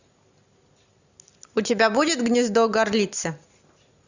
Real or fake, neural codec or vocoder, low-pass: real; none; 7.2 kHz